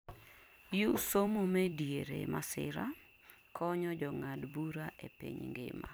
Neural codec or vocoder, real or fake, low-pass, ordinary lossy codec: vocoder, 44.1 kHz, 128 mel bands every 256 samples, BigVGAN v2; fake; none; none